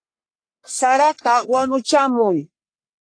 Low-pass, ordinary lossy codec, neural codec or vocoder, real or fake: 9.9 kHz; AAC, 48 kbps; codec, 44.1 kHz, 1.7 kbps, Pupu-Codec; fake